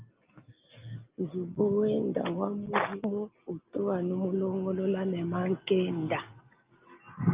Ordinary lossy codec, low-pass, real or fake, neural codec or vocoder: Opus, 24 kbps; 3.6 kHz; real; none